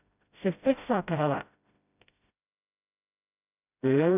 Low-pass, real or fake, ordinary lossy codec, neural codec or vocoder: 3.6 kHz; fake; none; codec, 16 kHz, 0.5 kbps, FreqCodec, smaller model